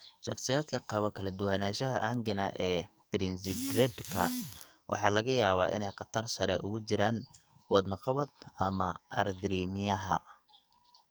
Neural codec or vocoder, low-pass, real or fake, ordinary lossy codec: codec, 44.1 kHz, 2.6 kbps, SNAC; none; fake; none